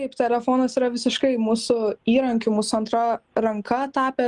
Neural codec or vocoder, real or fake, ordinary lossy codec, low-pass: none; real; Opus, 32 kbps; 10.8 kHz